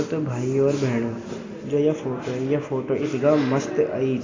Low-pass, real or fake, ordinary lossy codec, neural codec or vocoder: 7.2 kHz; real; AAC, 32 kbps; none